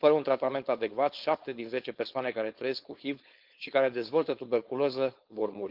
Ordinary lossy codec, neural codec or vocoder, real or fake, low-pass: Opus, 32 kbps; codec, 16 kHz, 4.8 kbps, FACodec; fake; 5.4 kHz